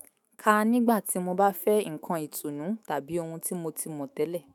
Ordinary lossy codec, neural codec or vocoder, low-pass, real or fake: none; autoencoder, 48 kHz, 128 numbers a frame, DAC-VAE, trained on Japanese speech; none; fake